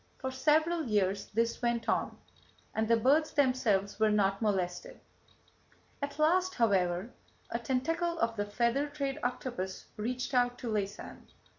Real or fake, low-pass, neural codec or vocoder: real; 7.2 kHz; none